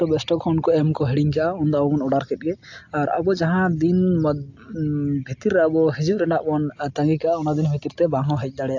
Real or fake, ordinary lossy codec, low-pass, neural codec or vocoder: real; AAC, 48 kbps; 7.2 kHz; none